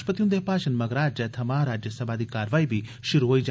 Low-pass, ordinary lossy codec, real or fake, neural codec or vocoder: none; none; real; none